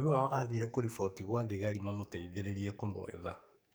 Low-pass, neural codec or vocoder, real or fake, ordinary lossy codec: none; codec, 44.1 kHz, 2.6 kbps, SNAC; fake; none